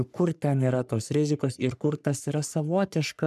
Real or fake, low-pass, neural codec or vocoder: fake; 14.4 kHz; codec, 44.1 kHz, 3.4 kbps, Pupu-Codec